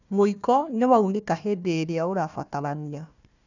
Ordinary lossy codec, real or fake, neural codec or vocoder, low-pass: none; fake; codec, 16 kHz, 1 kbps, FunCodec, trained on Chinese and English, 50 frames a second; 7.2 kHz